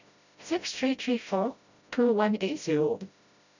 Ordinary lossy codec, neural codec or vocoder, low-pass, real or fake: none; codec, 16 kHz, 0.5 kbps, FreqCodec, smaller model; 7.2 kHz; fake